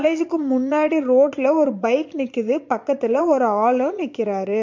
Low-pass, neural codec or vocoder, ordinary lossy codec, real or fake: 7.2 kHz; vocoder, 22.05 kHz, 80 mel bands, Vocos; MP3, 48 kbps; fake